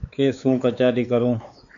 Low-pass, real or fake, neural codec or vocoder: 7.2 kHz; fake; codec, 16 kHz, 4 kbps, X-Codec, WavLM features, trained on Multilingual LibriSpeech